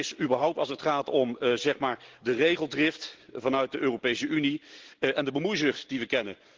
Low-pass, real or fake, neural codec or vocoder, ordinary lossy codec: 7.2 kHz; real; none; Opus, 16 kbps